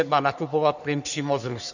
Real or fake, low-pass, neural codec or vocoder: fake; 7.2 kHz; codec, 44.1 kHz, 3.4 kbps, Pupu-Codec